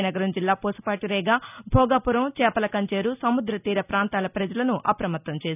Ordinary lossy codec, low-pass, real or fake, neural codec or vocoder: none; 3.6 kHz; real; none